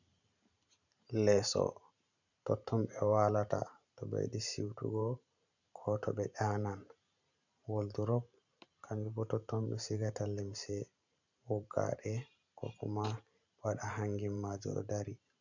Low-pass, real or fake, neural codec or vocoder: 7.2 kHz; fake; vocoder, 44.1 kHz, 128 mel bands every 512 samples, BigVGAN v2